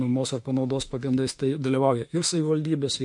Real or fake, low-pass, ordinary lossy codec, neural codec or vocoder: fake; 10.8 kHz; MP3, 48 kbps; autoencoder, 48 kHz, 32 numbers a frame, DAC-VAE, trained on Japanese speech